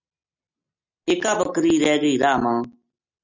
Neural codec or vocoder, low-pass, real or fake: none; 7.2 kHz; real